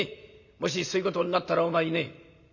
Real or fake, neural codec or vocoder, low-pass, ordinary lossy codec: real; none; 7.2 kHz; none